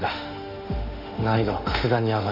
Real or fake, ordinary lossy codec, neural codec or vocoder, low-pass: fake; none; autoencoder, 48 kHz, 32 numbers a frame, DAC-VAE, trained on Japanese speech; 5.4 kHz